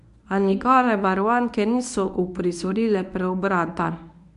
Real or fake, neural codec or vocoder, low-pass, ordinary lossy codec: fake; codec, 24 kHz, 0.9 kbps, WavTokenizer, medium speech release version 1; 10.8 kHz; AAC, 96 kbps